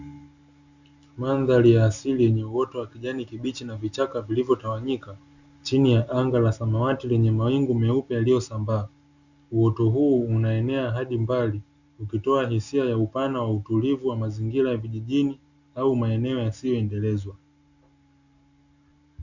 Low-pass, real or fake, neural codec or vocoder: 7.2 kHz; real; none